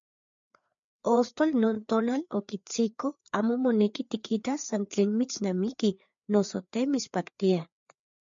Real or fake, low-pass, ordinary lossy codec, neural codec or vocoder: fake; 7.2 kHz; MP3, 64 kbps; codec, 16 kHz, 4 kbps, FreqCodec, larger model